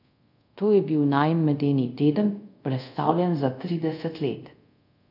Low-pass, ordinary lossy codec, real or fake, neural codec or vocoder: 5.4 kHz; none; fake; codec, 24 kHz, 0.5 kbps, DualCodec